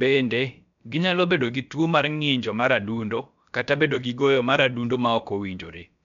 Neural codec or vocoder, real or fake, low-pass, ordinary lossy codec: codec, 16 kHz, about 1 kbps, DyCAST, with the encoder's durations; fake; 7.2 kHz; none